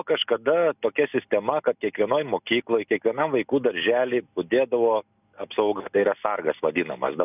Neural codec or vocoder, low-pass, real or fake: none; 3.6 kHz; real